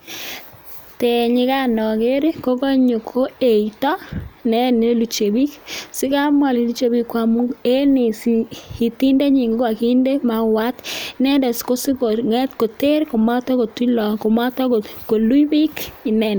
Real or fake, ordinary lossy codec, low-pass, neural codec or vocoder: real; none; none; none